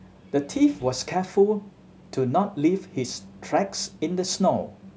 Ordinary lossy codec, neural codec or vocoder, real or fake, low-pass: none; none; real; none